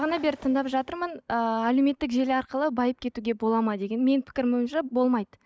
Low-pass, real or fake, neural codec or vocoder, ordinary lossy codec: none; real; none; none